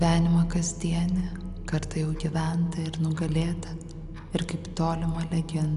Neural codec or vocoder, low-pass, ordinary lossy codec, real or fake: none; 10.8 kHz; MP3, 96 kbps; real